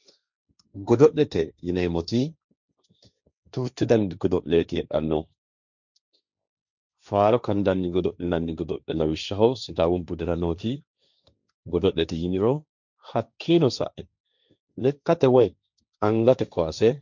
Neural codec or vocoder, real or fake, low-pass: codec, 16 kHz, 1.1 kbps, Voila-Tokenizer; fake; 7.2 kHz